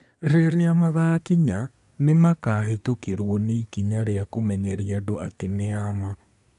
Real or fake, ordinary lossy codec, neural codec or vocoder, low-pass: fake; none; codec, 24 kHz, 1 kbps, SNAC; 10.8 kHz